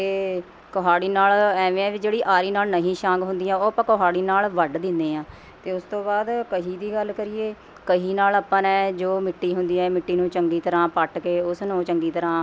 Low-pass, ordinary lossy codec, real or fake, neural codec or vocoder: none; none; real; none